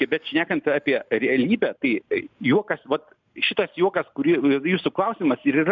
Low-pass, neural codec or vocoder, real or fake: 7.2 kHz; none; real